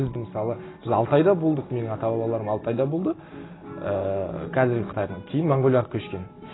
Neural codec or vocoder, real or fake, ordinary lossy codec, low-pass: none; real; AAC, 16 kbps; 7.2 kHz